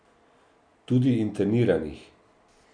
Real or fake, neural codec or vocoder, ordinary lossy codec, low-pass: real; none; none; 9.9 kHz